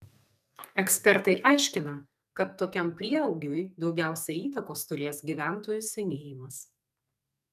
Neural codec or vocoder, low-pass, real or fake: codec, 44.1 kHz, 2.6 kbps, SNAC; 14.4 kHz; fake